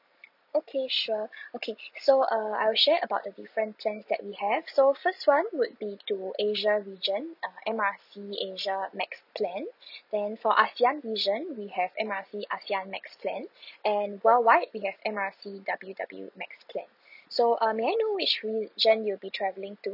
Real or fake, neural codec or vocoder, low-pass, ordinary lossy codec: real; none; 5.4 kHz; none